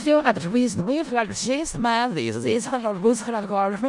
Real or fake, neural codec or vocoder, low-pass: fake; codec, 16 kHz in and 24 kHz out, 0.4 kbps, LongCat-Audio-Codec, four codebook decoder; 10.8 kHz